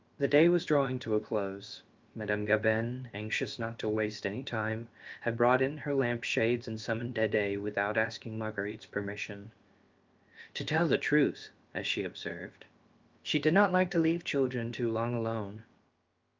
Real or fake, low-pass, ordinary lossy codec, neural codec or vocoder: fake; 7.2 kHz; Opus, 32 kbps; codec, 16 kHz, about 1 kbps, DyCAST, with the encoder's durations